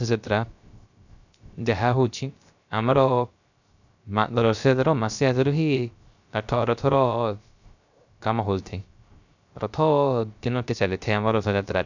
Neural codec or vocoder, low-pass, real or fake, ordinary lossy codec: codec, 16 kHz, 0.3 kbps, FocalCodec; 7.2 kHz; fake; none